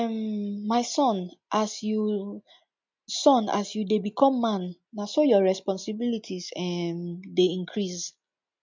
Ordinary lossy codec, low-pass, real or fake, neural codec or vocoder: MP3, 64 kbps; 7.2 kHz; real; none